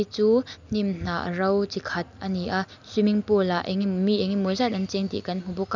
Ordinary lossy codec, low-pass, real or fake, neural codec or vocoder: none; 7.2 kHz; real; none